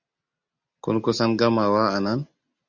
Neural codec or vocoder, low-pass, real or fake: none; 7.2 kHz; real